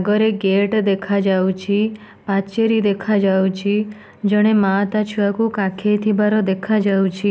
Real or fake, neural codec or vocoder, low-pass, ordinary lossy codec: real; none; none; none